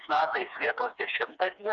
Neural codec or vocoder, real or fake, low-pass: codec, 16 kHz, 2 kbps, FreqCodec, smaller model; fake; 7.2 kHz